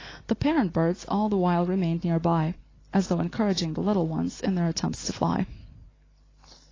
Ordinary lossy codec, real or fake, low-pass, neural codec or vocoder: AAC, 32 kbps; real; 7.2 kHz; none